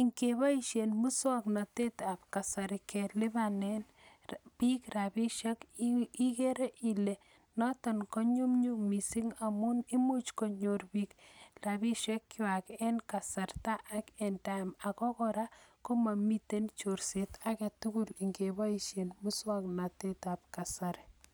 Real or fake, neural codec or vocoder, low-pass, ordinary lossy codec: real; none; none; none